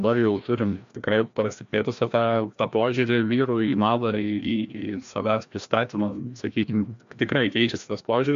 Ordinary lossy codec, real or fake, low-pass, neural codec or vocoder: MP3, 64 kbps; fake; 7.2 kHz; codec, 16 kHz, 1 kbps, FreqCodec, larger model